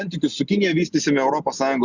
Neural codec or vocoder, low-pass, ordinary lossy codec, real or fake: none; 7.2 kHz; Opus, 64 kbps; real